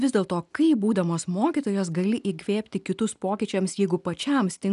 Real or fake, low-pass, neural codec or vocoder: real; 10.8 kHz; none